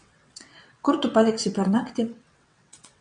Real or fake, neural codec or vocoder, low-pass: fake; vocoder, 22.05 kHz, 80 mel bands, WaveNeXt; 9.9 kHz